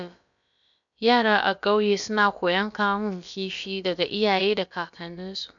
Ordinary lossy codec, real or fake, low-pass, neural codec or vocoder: none; fake; 7.2 kHz; codec, 16 kHz, about 1 kbps, DyCAST, with the encoder's durations